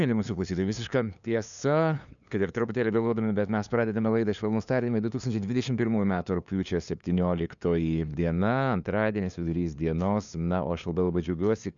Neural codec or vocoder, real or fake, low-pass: codec, 16 kHz, 2 kbps, FunCodec, trained on LibriTTS, 25 frames a second; fake; 7.2 kHz